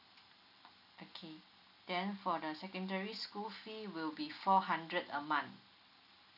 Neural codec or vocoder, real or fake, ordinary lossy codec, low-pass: none; real; none; 5.4 kHz